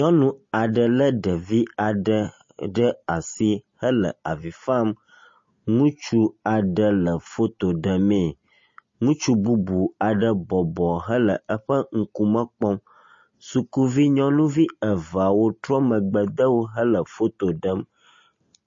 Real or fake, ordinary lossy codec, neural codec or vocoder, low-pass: real; MP3, 32 kbps; none; 7.2 kHz